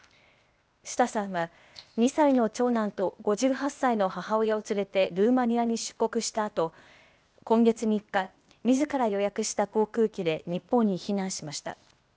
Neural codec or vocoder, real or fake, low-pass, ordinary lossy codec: codec, 16 kHz, 0.8 kbps, ZipCodec; fake; none; none